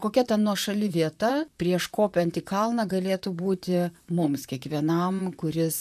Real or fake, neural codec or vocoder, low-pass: fake; vocoder, 44.1 kHz, 128 mel bands, Pupu-Vocoder; 14.4 kHz